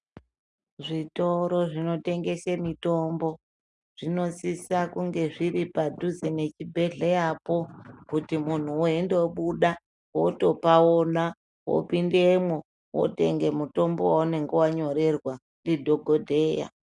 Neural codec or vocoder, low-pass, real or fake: none; 10.8 kHz; real